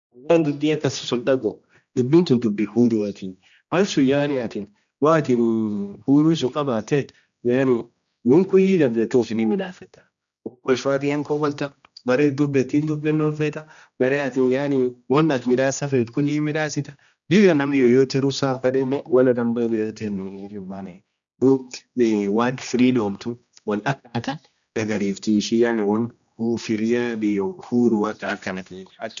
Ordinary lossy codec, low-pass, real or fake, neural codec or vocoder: none; 7.2 kHz; fake; codec, 16 kHz, 1 kbps, X-Codec, HuBERT features, trained on general audio